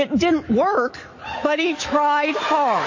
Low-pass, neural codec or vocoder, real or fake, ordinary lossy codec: 7.2 kHz; autoencoder, 48 kHz, 128 numbers a frame, DAC-VAE, trained on Japanese speech; fake; MP3, 32 kbps